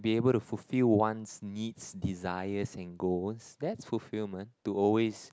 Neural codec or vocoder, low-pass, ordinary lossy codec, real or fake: none; none; none; real